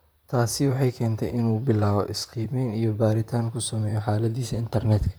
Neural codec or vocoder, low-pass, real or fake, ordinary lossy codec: vocoder, 44.1 kHz, 128 mel bands, Pupu-Vocoder; none; fake; none